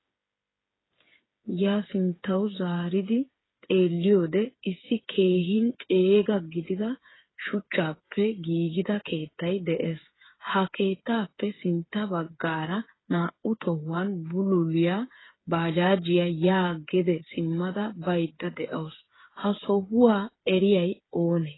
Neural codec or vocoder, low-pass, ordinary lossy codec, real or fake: codec, 16 kHz, 8 kbps, FreqCodec, smaller model; 7.2 kHz; AAC, 16 kbps; fake